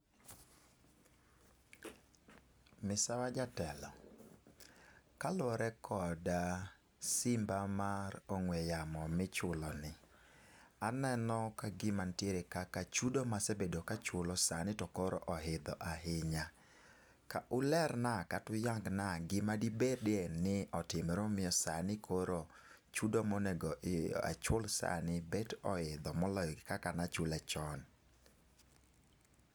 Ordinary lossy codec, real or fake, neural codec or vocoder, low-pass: none; real; none; none